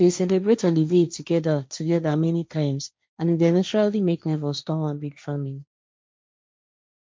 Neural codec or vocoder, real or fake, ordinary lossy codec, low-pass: codec, 16 kHz, 1.1 kbps, Voila-Tokenizer; fake; none; none